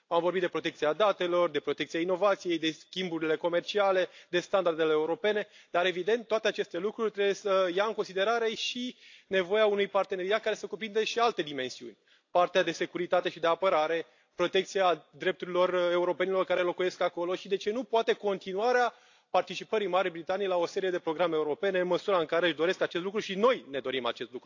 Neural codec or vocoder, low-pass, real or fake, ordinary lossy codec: none; 7.2 kHz; real; AAC, 48 kbps